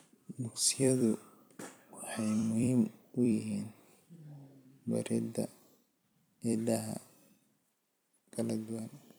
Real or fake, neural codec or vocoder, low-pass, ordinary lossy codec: fake; vocoder, 44.1 kHz, 128 mel bands every 256 samples, BigVGAN v2; none; none